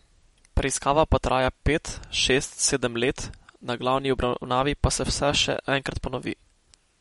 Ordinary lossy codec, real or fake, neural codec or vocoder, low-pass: MP3, 48 kbps; real; none; 19.8 kHz